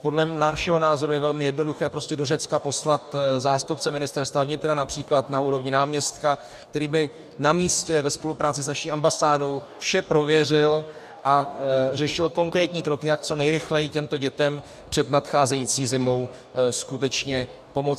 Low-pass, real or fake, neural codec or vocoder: 14.4 kHz; fake; codec, 44.1 kHz, 2.6 kbps, DAC